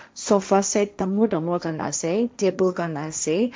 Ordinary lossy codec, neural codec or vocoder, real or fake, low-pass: none; codec, 16 kHz, 1.1 kbps, Voila-Tokenizer; fake; none